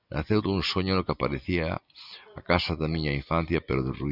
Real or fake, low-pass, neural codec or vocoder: real; 5.4 kHz; none